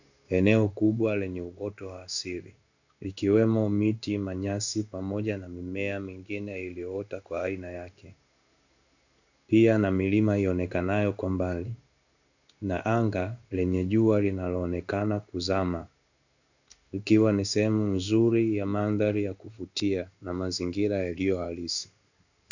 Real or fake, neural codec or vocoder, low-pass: fake; codec, 16 kHz in and 24 kHz out, 1 kbps, XY-Tokenizer; 7.2 kHz